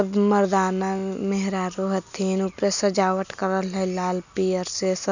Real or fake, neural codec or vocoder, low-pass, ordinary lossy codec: real; none; 7.2 kHz; none